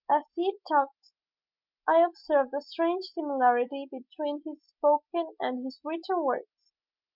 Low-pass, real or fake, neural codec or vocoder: 5.4 kHz; real; none